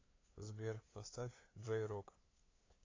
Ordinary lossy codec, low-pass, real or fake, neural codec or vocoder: AAC, 32 kbps; 7.2 kHz; fake; codec, 16 kHz, 2 kbps, FunCodec, trained on LibriTTS, 25 frames a second